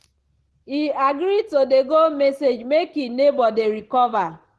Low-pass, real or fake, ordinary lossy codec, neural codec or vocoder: 10.8 kHz; real; Opus, 16 kbps; none